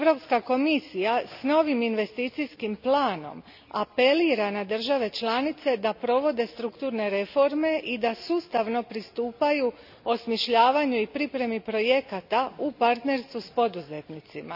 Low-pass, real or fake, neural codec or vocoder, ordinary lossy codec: 5.4 kHz; real; none; none